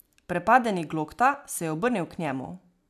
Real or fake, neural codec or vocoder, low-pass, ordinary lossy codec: real; none; 14.4 kHz; AAC, 96 kbps